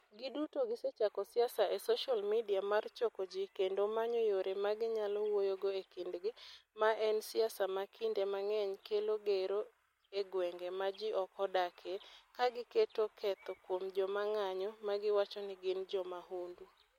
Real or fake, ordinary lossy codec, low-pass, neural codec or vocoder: real; MP3, 64 kbps; 19.8 kHz; none